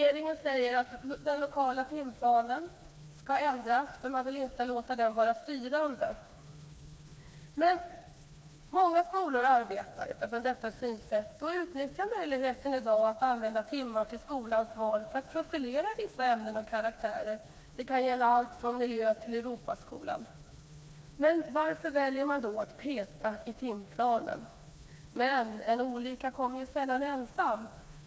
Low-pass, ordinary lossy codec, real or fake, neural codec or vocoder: none; none; fake; codec, 16 kHz, 2 kbps, FreqCodec, smaller model